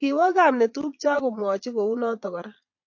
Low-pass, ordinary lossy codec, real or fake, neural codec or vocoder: 7.2 kHz; AAC, 48 kbps; fake; vocoder, 22.05 kHz, 80 mel bands, Vocos